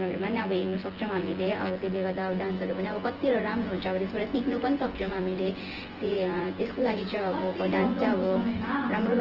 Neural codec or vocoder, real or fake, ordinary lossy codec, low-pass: vocoder, 24 kHz, 100 mel bands, Vocos; fake; Opus, 16 kbps; 5.4 kHz